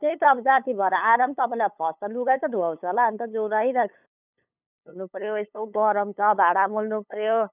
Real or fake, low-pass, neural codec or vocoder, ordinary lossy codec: fake; 3.6 kHz; codec, 16 kHz, 8 kbps, FunCodec, trained on LibriTTS, 25 frames a second; none